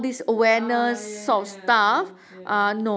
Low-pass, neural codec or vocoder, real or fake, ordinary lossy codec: none; none; real; none